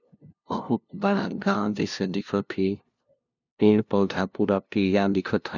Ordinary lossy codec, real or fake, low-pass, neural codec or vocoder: none; fake; 7.2 kHz; codec, 16 kHz, 0.5 kbps, FunCodec, trained on LibriTTS, 25 frames a second